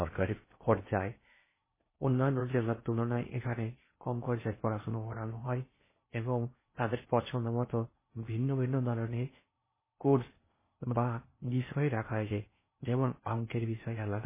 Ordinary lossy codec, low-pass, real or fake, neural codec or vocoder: MP3, 16 kbps; 3.6 kHz; fake; codec, 16 kHz in and 24 kHz out, 0.6 kbps, FocalCodec, streaming, 4096 codes